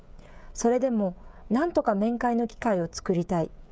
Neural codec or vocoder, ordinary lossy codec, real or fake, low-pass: codec, 16 kHz, 16 kbps, FreqCodec, smaller model; none; fake; none